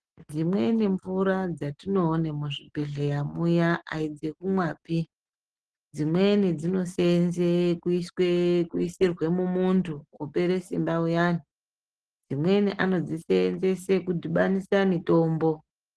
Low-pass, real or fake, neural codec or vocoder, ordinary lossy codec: 10.8 kHz; real; none; Opus, 16 kbps